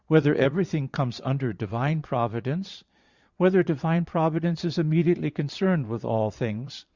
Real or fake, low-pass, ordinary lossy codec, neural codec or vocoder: fake; 7.2 kHz; Opus, 64 kbps; vocoder, 22.05 kHz, 80 mel bands, Vocos